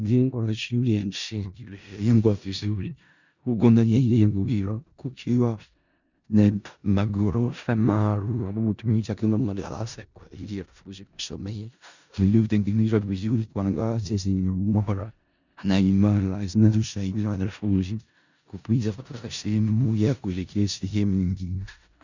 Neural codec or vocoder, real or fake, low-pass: codec, 16 kHz in and 24 kHz out, 0.4 kbps, LongCat-Audio-Codec, four codebook decoder; fake; 7.2 kHz